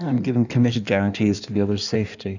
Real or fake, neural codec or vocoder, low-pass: fake; codec, 16 kHz in and 24 kHz out, 1.1 kbps, FireRedTTS-2 codec; 7.2 kHz